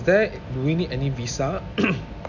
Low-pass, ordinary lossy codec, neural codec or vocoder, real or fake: 7.2 kHz; none; none; real